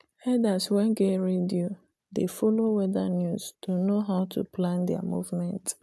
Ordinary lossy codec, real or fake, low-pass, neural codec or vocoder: none; real; none; none